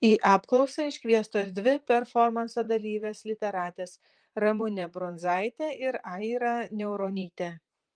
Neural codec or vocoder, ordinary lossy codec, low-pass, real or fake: vocoder, 44.1 kHz, 128 mel bands, Pupu-Vocoder; Opus, 32 kbps; 9.9 kHz; fake